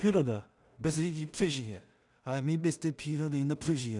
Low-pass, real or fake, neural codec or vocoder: 10.8 kHz; fake; codec, 16 kHz in and 24 kHz out, 0.4 kbps, LongCat-Audio-Codec, two codebook decoder